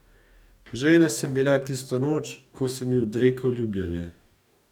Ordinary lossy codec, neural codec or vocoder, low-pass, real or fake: none; codec, 44.1 kHz, 2.6 kbps, DAC; 19.8 kHz; fake